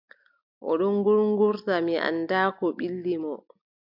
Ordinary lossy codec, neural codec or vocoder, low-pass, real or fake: AAC, 48 kbps; none; 5.4 kHz; real